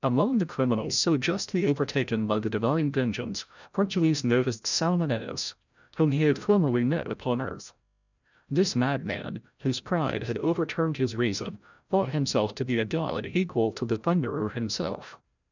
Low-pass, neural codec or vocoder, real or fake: 7.2 kHz; codec, 16 kHz, 0.5 kbps, FreqCodec, larger model; fake